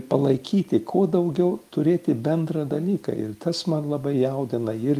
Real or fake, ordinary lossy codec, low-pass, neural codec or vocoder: real; Opus, 32 kbps; 14.4 kHz; none